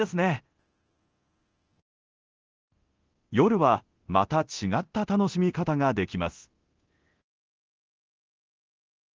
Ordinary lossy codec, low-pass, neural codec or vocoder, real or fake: Opus, 16 kbps; 7.2 kHz; codec, 24 kHz, 0.9 kbps, DualCodec; fake